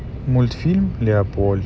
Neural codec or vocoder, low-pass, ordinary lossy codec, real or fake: none; none; none; real